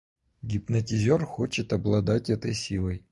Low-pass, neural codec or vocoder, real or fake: 10.8 kHz; none; real